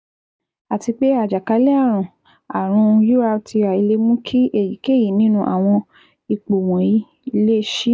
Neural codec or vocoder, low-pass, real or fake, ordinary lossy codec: none; none; real; none